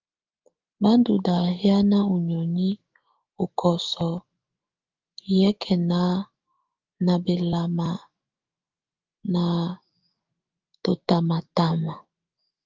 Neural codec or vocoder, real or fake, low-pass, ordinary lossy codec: autoencoder, 48 kHz, 128 numbers a frame, DAC-VAE, trained on Japanese speech; fake; 7.2 kHz; Opus, 32 kbps